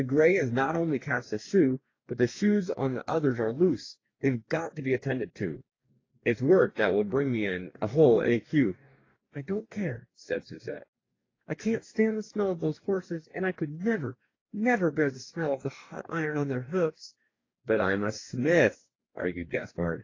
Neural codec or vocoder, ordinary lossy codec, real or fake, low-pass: codec, 44.1 kHz, 2.6 kbps, DAC; AAC, 32 kbps; fake; 7.2 kHz